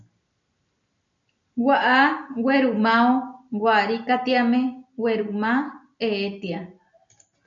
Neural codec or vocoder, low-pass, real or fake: none; 7.2 kHz; real